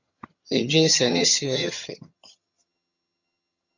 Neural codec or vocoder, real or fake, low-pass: vocoder, 22.05 kHz, 80 mel bands, HiFi-GAN; fake; 7.2 kHz